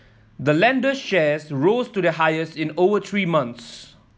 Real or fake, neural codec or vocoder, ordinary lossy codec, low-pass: real; none; none; none